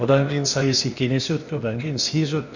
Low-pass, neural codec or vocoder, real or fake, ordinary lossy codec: 7.2 kHz; codec, 16 kHz, 0.8 kbps, ZipCodec; fake; none